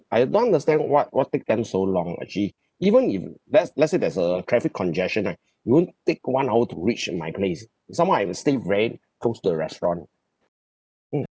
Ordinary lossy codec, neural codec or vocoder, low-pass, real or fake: none; none; none; real